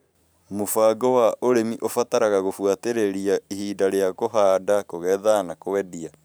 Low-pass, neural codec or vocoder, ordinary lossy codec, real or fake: none; vocoder, 44.1 kHz, 128 mel bands every 512 samples, BigVGAN v2; none; fake